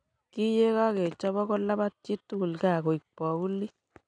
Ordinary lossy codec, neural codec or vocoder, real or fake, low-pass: none; none; real; 9.9 kHz